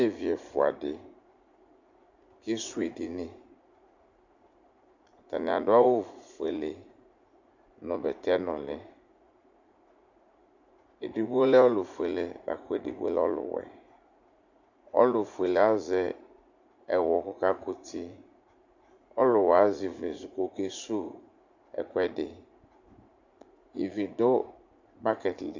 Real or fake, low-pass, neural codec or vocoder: fake; 7.2 kHz; vocoder, 44.1 kHz, 80 mel bands, Vocos